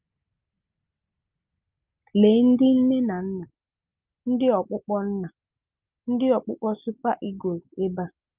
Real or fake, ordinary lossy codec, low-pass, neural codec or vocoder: real; Opus, 24 kbps; 3.6 kHz; none